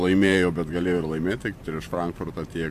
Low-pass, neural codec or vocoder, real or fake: 14.4 kHz; vocoder, 44.1 kHz, 128 mel bands every 512 samples, BigVGAN v2; fake